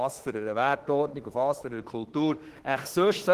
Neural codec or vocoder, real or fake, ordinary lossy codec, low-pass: autoencoder, 48 kHz, 32 numbers a frame, DAC-VAE, trained on Japanese speech; fake; Opus, 16 kbps; 14.4 kHz